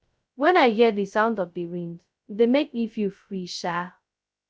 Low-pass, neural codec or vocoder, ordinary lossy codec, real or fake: none; codec, 16 kHz, 0.2 kbps, FocalCodec; none; fake